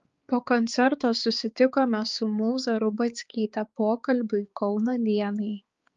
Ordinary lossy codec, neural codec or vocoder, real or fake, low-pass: Opus, 32 kbps; codec, 16 kHz, 4 kbps, X-Codec, HuBERT features, trained on balanced general audio; fake; 7.2 kHz